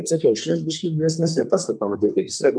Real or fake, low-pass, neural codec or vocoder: fake; 9.9 kHz; codec, 24 kHz, 1 kbps, SNAC